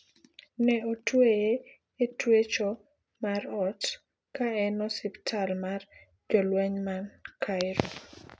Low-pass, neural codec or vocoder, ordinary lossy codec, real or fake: none; none; none; real